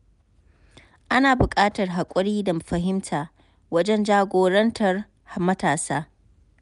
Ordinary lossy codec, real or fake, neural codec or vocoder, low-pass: none; real; none; 10.8 kHz